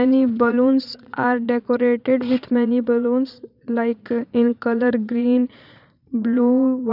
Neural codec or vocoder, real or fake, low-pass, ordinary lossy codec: vocoder, 22.05 kHz, 80 mel bands, Vocos; fake; 5.4 kHz; none